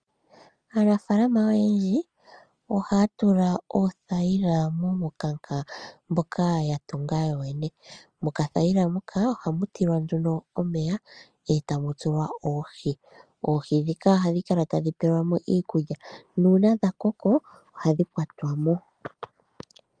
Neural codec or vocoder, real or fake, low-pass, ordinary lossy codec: none; real; 9.9 kHz; Opus, 32 kbps